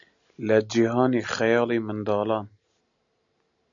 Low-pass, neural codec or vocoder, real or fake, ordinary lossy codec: 7.2 kHz; none; real; MP3, 96 kbps